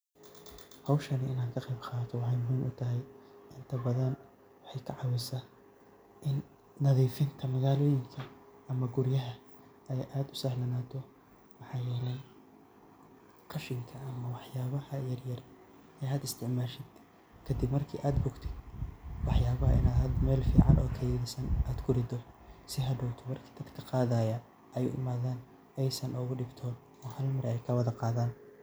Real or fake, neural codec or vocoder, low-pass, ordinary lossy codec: real; none; none; none